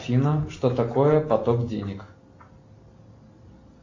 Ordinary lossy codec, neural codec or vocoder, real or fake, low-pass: MP3, 48 kbps; none; real; 7.2 kHz